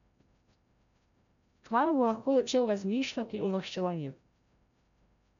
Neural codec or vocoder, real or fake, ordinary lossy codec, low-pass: codec, 16 kHz, 0.5 kbps, FreqCodec, larger model; fake; none; 7.2 kHz